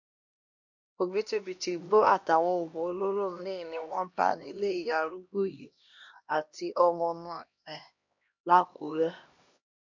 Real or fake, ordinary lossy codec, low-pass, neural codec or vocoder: fake; MP3, 48 kbps; 7.2 kHz; codec, 16 kHz, 1 kbps, X-Codec, HuBERT features, trained on LibriSpeech